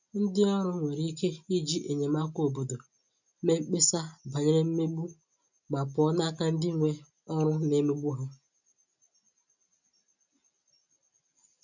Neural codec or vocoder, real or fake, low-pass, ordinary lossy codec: none; real; 7.2 kHz; none